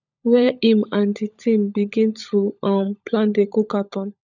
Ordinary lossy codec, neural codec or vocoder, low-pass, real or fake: none; codec, 16 kHz, 16 kbps, FunCodec, trained on LibriTTS, 50 frames a second; 7.2 kHz; fake